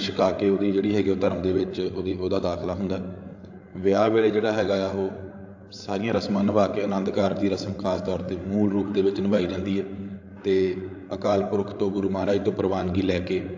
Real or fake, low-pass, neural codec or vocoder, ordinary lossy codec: fake; 7.2 kHz; codec, 16 kHz, 8 kbps, FreqCodec, larger model; AAC, 48 kbps